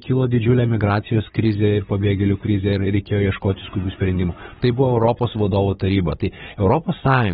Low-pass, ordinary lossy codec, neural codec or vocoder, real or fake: 7.2 kHz; AAC, 16 kbps; codec, 16 kHz, 16 kbps, FunCodec, trained on Chinese and English, 50 frames a second; fake